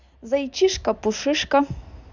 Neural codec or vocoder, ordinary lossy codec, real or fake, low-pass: none; none; real; 7.2 kHz